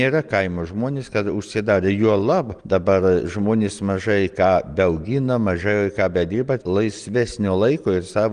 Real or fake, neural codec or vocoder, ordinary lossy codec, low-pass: real; none; Opus, 24 kbps; 9.9 kHz